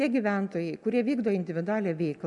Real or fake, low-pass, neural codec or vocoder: real; 10.8 kHz; none